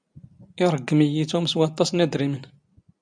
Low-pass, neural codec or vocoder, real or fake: 9.9 kHz; none; real